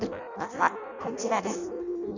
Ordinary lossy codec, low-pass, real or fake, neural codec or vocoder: none; 7.2 kHz; fake; codec, 16 kHz in and 24 kHz out, 0.6 kbps, FireRedTTS-2 codec